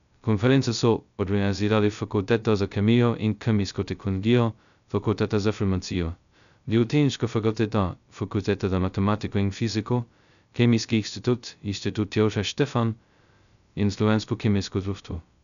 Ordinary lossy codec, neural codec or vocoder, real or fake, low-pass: none; codec, 16 kHz, 0.2 kbps, FocalCodec; fake; 7.2 kHz